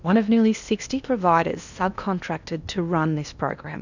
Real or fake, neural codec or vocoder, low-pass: fake; codec, 16 kHz in and 24 kHz out, 0.8 kbps, FocalCodec, streaming, 65536 codes; 7.2 kHz